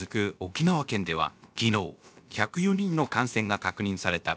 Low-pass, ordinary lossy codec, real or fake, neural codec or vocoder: none; none; fake; codec, 16 kHz, about 1 kbps, DyCAST, with the encoder's durations